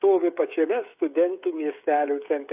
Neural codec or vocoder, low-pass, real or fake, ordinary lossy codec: codec, 16 kHz, 8 kbps, FreqCodec, smaller model; 3.6 kHz; fake; AAC, 32 kbps